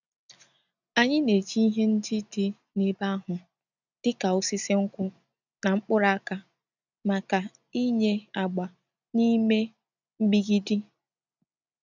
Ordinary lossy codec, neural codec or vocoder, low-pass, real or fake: none; none; 7.2 kHz; real